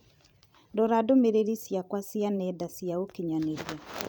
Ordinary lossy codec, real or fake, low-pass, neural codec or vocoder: none; fake; none; vocoder, 44.1 kHz, 128 mel bands every 512 samples, BigVGAN v2